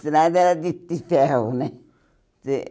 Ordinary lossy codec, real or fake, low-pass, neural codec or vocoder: none; real; none; none